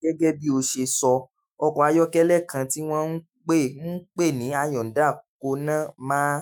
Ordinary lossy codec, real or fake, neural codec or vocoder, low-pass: none; fake; autoencoder, 48 kHz, 128 numbers a frame, DAC-VAE, trained on Japanese speech; none